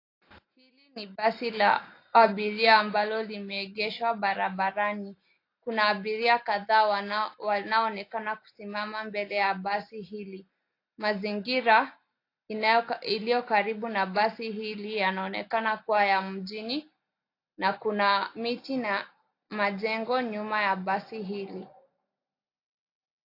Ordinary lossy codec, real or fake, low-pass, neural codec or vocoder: AAC, 32 kbps; real; 5.4 kHz; none